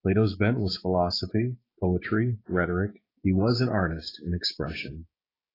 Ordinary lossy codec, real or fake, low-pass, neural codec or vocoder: AAC, 24 kbps; fake; 5.4 kHz; codec, 16 kHz, 6 kbps, DAC